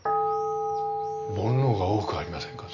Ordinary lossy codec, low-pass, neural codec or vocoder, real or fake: none; 7.2 kHz; none; real